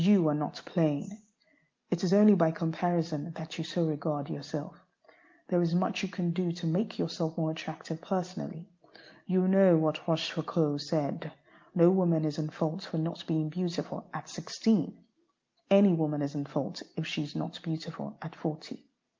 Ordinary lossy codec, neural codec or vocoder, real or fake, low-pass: Opus, 24 kbps; none; real; 7.2 kHz